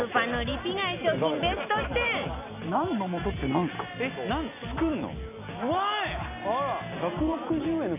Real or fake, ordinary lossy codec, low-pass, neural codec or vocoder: real; none; 3.6 kHz; none